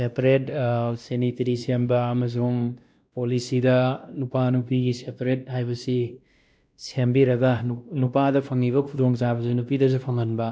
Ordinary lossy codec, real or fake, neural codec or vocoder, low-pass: none; fake; codec, 16 kHz, 1 kbps, X-Codec, WavLM features, trained on Multilingual LibriSpeech; none